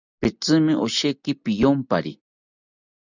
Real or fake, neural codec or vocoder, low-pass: real; none; 7.2 kHz